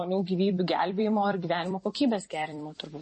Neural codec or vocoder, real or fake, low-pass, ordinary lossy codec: vocoder, 44.1 kHz, 128 mel bands every 256 samples, BigVGAN v2; fake; 10.8 kHz; MP3, 32 kbps